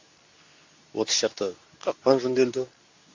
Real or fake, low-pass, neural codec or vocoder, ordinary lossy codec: fake; 7.2 kHz; codec, 24 kHz, 0.9 kbps, WavTokenizer, medium speech release version 2; AAC, 48 kbps